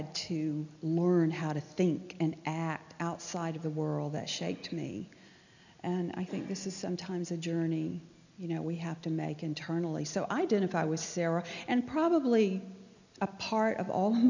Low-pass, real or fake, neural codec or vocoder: 7.2 kHz; real; none